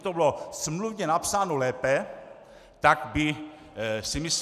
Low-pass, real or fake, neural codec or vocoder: 14.4 kHz; real; none